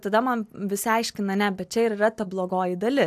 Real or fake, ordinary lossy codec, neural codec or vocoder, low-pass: real; AAC, 96 kbps; none; 14.4 kHz